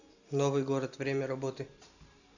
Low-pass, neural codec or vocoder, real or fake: 7.2 kHz; none; real